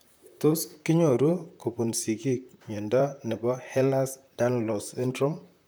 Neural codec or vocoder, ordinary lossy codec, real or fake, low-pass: vocoder, 44.1 kHz, 128 mel bands, Pupu-Vocoder; none; fake; none